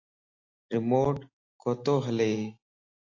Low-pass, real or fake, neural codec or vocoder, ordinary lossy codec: 7.2 kHz; real; none; AAC, 48 kbps